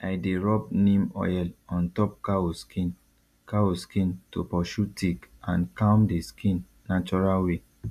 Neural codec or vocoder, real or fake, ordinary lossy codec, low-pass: none; real; none; 14.4 kHz